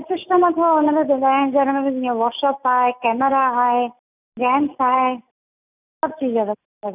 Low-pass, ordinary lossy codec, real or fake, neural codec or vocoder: 3.6 kHz; none; real; none